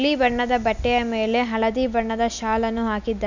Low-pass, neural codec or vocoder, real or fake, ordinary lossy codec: 7.2 kHz; none; real; none